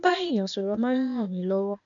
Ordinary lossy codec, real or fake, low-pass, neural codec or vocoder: none; fake; 7.2 kHz; codec, 16 kHz, 0.8 kbps, ZipCodec